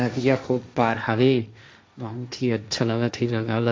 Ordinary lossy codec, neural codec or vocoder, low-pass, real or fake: none; codec, 16 kHz, 1.1 kbps, Voila-Tokenizer; none; fake